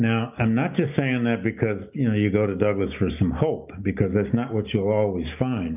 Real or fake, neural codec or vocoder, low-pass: real; none; 3.6 kHz